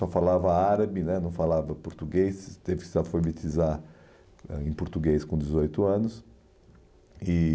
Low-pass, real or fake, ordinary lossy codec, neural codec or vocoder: none; real; none; none